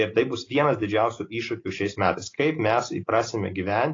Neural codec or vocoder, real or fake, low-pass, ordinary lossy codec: none; real; 7.2 kHz; AAC, 32 kbps